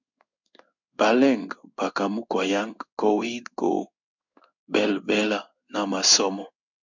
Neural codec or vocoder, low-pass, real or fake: codec, 16 kHz in and 24 kHz out, 1 kbps, XY-Tokenizer; 7.2 kHz; fake